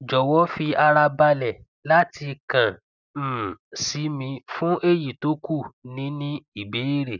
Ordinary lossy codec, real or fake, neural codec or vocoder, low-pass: none; real; none; 7.2 kHz